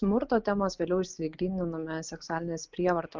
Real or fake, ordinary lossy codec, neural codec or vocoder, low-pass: real; Opus, 24 kbps; none; 7.2 kHz